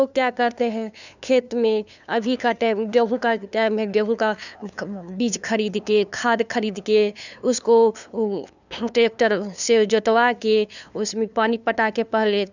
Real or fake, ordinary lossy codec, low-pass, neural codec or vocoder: fake; none; 7.2 kHz; codec, 16 kHz, 2 kbps, FunCodec, trained on LibriTTS, 25 frames a second